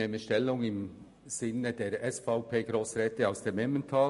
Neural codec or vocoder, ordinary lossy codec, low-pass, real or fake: none; MP3, 48 kbps; 14.4 kHz; real